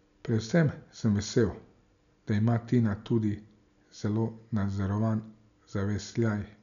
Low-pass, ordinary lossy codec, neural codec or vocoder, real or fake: 7.2 kHz; none; none; real